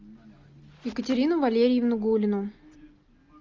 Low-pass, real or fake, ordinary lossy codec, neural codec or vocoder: 7.2 kHz; real; Opus, 32 kbps; none